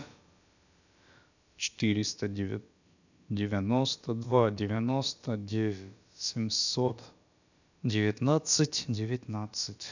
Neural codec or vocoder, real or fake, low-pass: codec, 16 kHz, about 1 kbps, DyCAST, with the encoder's durations; fake; 7.2 kHz